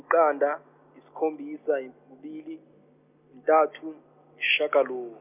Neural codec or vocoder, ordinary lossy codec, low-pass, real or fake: none; none; 3.6 kHz; real